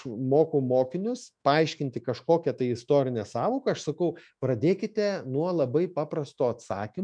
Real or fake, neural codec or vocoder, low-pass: fake; autoencoder, 48 kHz, 128 numbers a frame, DAC-VAE, trained on Japanese speech; 9.9 kHz